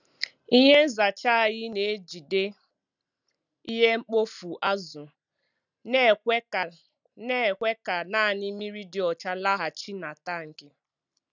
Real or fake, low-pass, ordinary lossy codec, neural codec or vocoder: real; 7.2 kHz; none; none